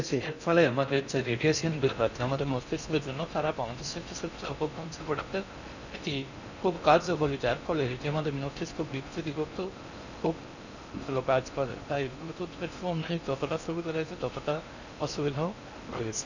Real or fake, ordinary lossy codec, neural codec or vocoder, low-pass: fake; none; codec, 16 kHz in and 24 kHz out, 0.6 kbps, FocalCodec, streaming, 4096 codes; 7.2 kHz